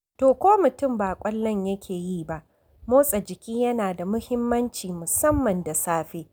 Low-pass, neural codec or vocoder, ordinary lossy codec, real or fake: none; none; none; real